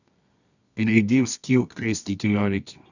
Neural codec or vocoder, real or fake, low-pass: codec, 24 kHz, 0.9 kbps, WavTokenizer, medium music audio release; fake; 7.2 kHz